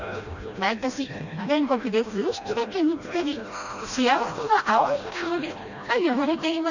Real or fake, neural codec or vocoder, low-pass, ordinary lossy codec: fake; codec, 16 kHz, 1 kbps, FreqCodec, smaller model; 7.2 kHz; none